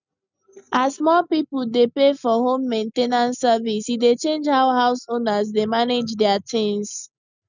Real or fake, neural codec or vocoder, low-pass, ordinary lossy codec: real; none; 7.2 kHz; none